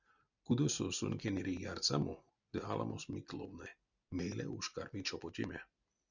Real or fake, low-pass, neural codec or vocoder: real; 7.2 kHz; none